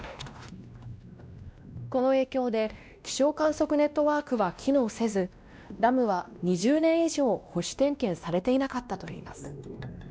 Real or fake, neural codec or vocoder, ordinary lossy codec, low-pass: fake; codec, 16 kHz, 1 kbps, X-Codec, WavLM features, trained on Multilingual LibriSpeech; none; none